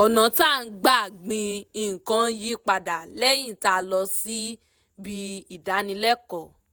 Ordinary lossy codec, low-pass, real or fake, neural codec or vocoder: none; none; fake; vocoder, 48 kHz, 128 mel bands, Vocos